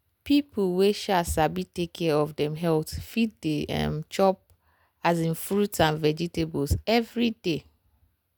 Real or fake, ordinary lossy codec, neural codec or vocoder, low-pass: real; none; none; none